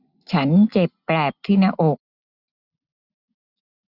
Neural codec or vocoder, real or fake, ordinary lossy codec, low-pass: none; real; none; 5.4 kHz